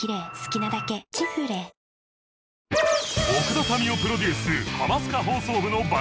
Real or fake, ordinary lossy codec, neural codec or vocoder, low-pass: real; none; none; none